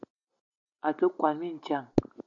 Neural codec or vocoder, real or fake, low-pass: none; real; 7.2 kHz